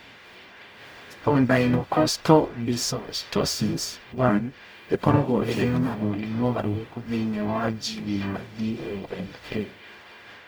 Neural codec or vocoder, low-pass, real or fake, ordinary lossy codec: codec, 44.1 kHz, 0.9 kbps, DAC; none; fake; none